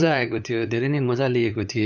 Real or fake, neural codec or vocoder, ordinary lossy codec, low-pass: fake; codec, 16 kHz, 8 kbps, FunCodec, trained on LibriTTS, 25 frames a second; none; 7.2 kHz